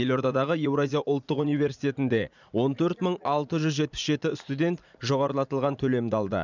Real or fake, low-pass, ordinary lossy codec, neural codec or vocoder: fake; 7.2 kHz; none; vocoder, 44.1 kHz, 80 mel bands, Vocos